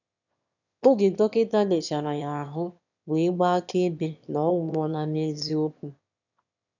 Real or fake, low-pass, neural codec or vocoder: fake; 7.2 kHz; autoencoder, 22.05 kHz, a latent of 192 numbers a frame, VITS, trained on one speaker